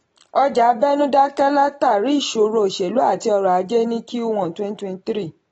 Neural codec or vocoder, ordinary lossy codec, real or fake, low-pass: none; AAC, 24 kbps; real; 10.8 kHz